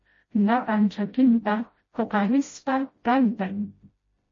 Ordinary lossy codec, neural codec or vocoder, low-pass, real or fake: MP3, 32 kbps; codec, 16 kHz, 0.5 kbps, FreqCodec, smaller model; 7.2 kHz; fake